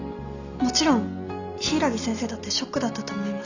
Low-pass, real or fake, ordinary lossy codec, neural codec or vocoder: 7.2 kHz; real; none; none